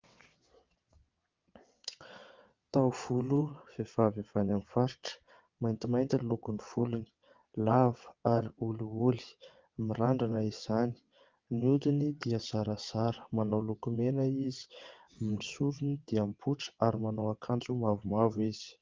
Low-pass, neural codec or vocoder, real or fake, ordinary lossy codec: 7.2 kHz; vocoder, 22.05 kHz, 80 mel bands, WaveNeXt; fake; Opus, 32 kbps